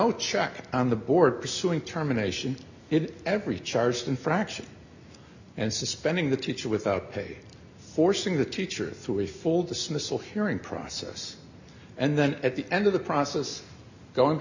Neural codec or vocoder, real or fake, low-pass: none; real; 7.2 kHz